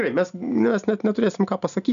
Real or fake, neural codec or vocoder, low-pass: real; none; 7.2 kHz